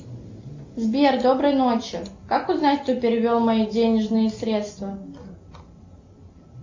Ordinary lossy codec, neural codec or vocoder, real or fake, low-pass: MP3, 48 kbps; none; real; 7.2 kHz